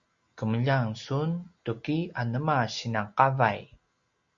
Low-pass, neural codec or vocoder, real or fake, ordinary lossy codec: 7.2 kHz; none; real; Opus, 64 kbps